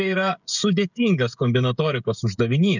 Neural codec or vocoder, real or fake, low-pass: codec, 16 kHz, 16 kbps, FreqCodec, smaller model; fake; 7.2 kHz